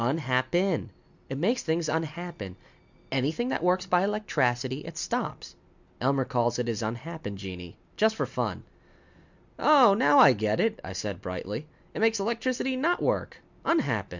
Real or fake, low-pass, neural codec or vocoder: real; 7.2 kHz; none